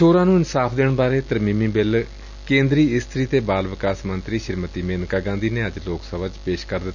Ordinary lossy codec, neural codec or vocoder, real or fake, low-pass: none; none; real; 7.2 kHz